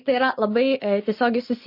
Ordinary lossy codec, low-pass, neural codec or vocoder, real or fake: MP3, 32 kbps; 5.4 kHz; none; real